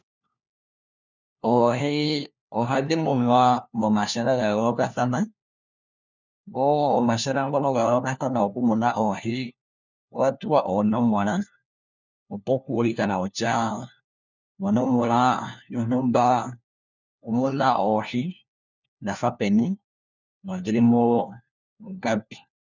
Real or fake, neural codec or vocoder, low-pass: fake; codec, 16 kHz, 1 kbps, FunCodec, trained on LibriTTS, 50 frames a second; 7.2 kHz